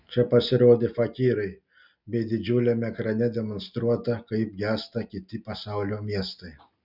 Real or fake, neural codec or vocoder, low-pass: real; none; 5.4 kHz